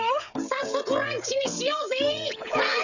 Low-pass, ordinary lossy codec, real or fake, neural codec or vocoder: 7.2 kHz; none; fake; codec, 16 kHz, 16 kbps, FreqCodec, smaller model